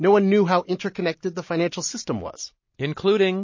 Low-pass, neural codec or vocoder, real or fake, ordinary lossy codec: 7.2 kHz; none; real; MP3, 32 kbps